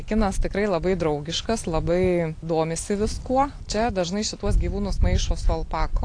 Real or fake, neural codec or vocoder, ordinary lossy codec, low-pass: real; none; AAC, 48 kbps; 9.9 kHz